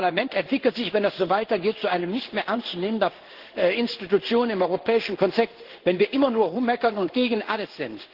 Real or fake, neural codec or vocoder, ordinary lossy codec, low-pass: fake; codec, 16 kHz in and 24 kHz out, 1 kbps, XY-Tokenizer; Opus, 16 kbps; 5.4 kHz